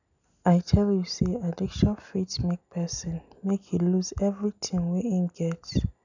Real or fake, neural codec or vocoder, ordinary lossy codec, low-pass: real; none; none; 7.2 kHz